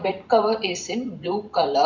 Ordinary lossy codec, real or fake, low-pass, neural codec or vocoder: none; fake; 7.2 kHz; vocoder, 44.1 kHz, 128 mel bands every 256 samples, BigVGAN v2